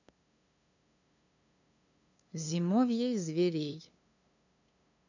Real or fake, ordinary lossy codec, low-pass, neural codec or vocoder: fake; none; 7.2 kHz; codec, 16 kHz, 2 kbps, FunCodec, trained on LibriTTS, 25 frames a second